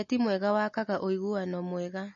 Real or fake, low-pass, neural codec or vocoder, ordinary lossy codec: real; 7.2 kHz; none; MP3, 32 kbps